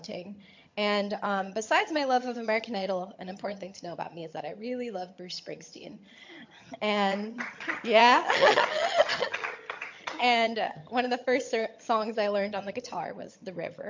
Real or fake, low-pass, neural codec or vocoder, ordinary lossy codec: fake; 7.2 kHz; vocoder, 22.05 kHz, 80 mel bands, HiFi-GAN; MP3, 48 kbps